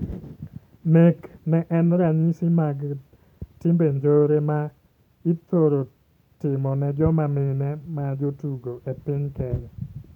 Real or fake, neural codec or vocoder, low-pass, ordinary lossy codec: fake; vocoder, 44.1 kHz, 128 mel bands every 512 samples, BigVGAN v2; 19.8 kHz; none